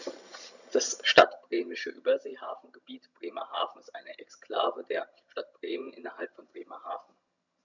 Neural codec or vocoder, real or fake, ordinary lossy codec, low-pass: vocoder, 22.05 kHz, 80 mel bands, HiFi-GAN; fake; none; 7.2 kHz